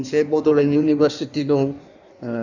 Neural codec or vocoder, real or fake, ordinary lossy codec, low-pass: codec, 16 kHz in and 24 kHz out, 1.1 kbps, FireRedTTS-2 codec; fake; none; 7.2 kHz